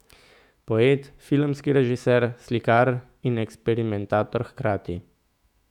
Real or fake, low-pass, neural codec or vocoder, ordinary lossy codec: fake; 19.8 kHz; autoencoder, 48 kHz, 128 numbers a frame, DAC-VAE, trained on Japanese speech; none